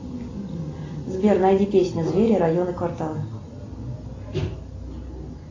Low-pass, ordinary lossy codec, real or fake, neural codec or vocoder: 7.2 kHz; AAC, 32 kbps; real; none